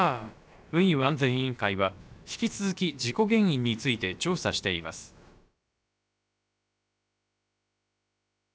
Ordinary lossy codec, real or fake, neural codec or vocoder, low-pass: none; fake; codec, 16 kHz, about 1 kbps, DyCAST, with the encoder's durations; none